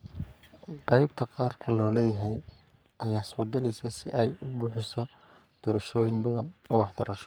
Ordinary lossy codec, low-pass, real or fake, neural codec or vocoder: none; none; fake; codec, 44.1 kHz, 3.4 kbps, Pupu-Codec